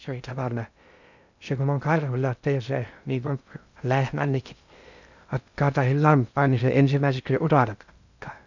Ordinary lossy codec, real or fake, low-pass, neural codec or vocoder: none; fake; 7.2 kHz; codec, 16 kHz in and 24 kHz out, 0.6 kbps, FocalCodec, streaming, 2048 codes